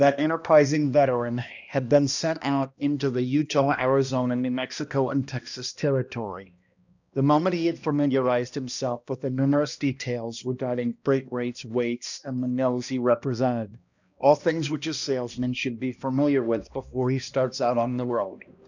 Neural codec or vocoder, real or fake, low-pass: codec, 16 kHz, 1 kbps, X-Codec, HuBERT features, trained on balanced general audio; fake; 7.2 kHz